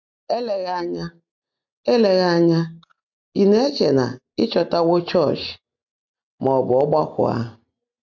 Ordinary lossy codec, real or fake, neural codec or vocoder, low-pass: MP3, 64 kbps; real; none; 7.2 kHz